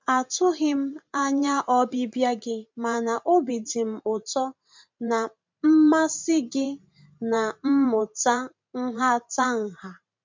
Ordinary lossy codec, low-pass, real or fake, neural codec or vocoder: MP3, 64 kbps; 7.2 kHz; fake; vocoder, 44.1 kHz, 128 mel bands every 512 samples, BigVGAN v2